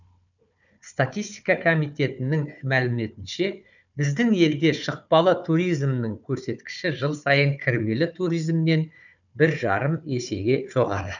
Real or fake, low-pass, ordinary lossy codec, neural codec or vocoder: fake; 7.2 kHz; none; codec, 16 kHz, 4 kbps, FunCodec, trained on Chinese and English, 50 frames a second